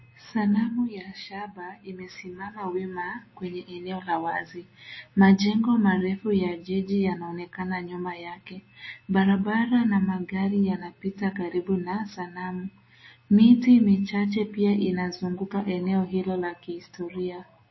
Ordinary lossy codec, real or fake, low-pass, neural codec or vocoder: MP3, 24 kbps; real; 7.2 kHz; none